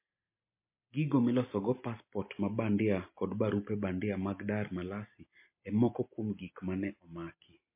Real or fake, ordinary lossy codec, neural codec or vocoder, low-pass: real; MP3, 24 kbps; none; 3.6 kHz